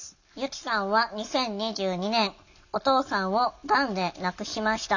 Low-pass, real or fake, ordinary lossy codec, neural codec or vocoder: 7.2 kHz; real; none; none